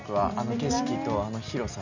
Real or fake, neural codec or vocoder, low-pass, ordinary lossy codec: real; none; 7.2 kHz; none